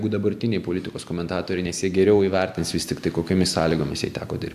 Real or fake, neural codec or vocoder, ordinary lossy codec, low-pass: real; none; AAC, 96 kbps; 14.4 kHz